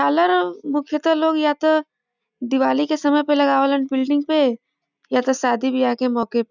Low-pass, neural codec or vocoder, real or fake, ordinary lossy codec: 7.2 kHz; none; real; none